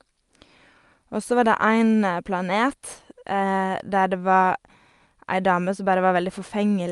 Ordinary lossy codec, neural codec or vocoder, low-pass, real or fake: Opus, 32 kbps; none; 10.8 kHz; real